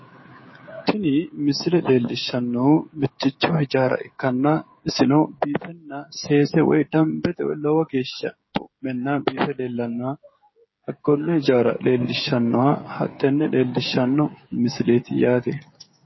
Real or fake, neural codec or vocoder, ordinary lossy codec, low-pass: fake; codec, 16 kHz, 16 kbps, FreqCodec, smaller model; MP3, 24 kbps; 7.2 kHz